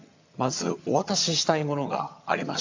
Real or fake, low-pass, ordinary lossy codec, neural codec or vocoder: fake; 7.2 kHz; AAC, 48 kbps; vocoder, 22.05 kHz, 80 mel bands, HiFi-GAN